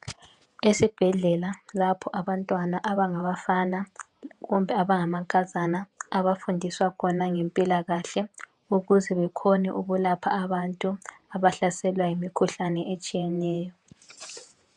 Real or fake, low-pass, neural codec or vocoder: fake; 10.8 kHz; vocoder, 48 kHz, 128 mel bands, Vocos